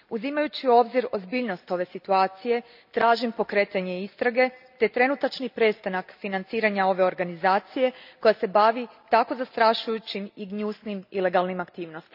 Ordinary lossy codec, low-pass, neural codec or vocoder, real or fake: none; 5.4 kHz; none; real